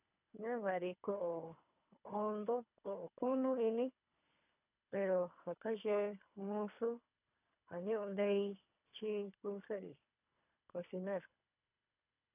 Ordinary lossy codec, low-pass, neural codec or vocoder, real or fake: none; 3.6 kHz; codec, 24 kHz, 3 kbps, HILCodec; fake